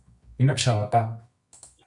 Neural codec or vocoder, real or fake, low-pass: codec, 24 kHz, 0.9 kbps, WavTokenizer, medium music audio release; fake; 10.8 kHz